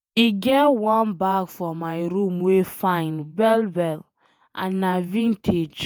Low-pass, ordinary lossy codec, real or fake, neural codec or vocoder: none; none; fake; vocoder, 48 kHz, 128 mel bands, Vocos